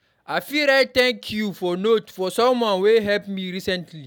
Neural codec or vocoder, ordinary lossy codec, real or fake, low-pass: none; none; real; 19.8 kHz